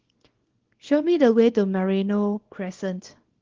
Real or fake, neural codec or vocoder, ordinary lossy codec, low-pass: fake; codec, 24 kHz, 0.9 kbps, WavTokenizer, small release; Opus, 16 kbps; 7.2 kHz